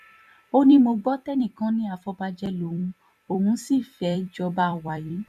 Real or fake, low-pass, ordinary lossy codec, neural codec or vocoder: fake; 14.4 kHz; none; vocoder, 44.1 kHz, 128 mel bands every 256 samples, BigVGAN v2